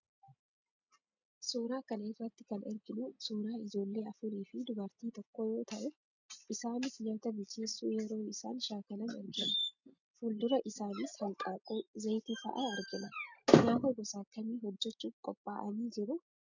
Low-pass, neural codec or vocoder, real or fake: 7.2 kHz; none; real